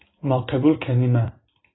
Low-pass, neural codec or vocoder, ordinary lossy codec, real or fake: 7.2 kHz; vocoder, 44.1 kHz, 128 mel bands every 256 samples, BigVGAN v2; AAC, 16 kbps; fake